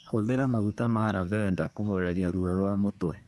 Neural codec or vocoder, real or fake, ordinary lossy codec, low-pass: codec, 24 kHz, 1 kbps, SNAC; fake; none; none